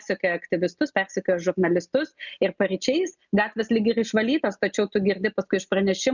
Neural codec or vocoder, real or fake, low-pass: none; real; 7.2 kHz